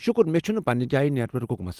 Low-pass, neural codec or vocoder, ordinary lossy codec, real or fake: 14.4 kHz; none; Opus, 24 kbps; real